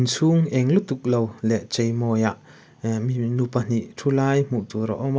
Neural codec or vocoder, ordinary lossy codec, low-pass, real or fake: none; none; none; real